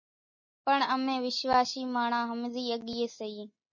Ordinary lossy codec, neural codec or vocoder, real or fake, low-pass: MP3, 32 kbps; none; real; 7.2 kHz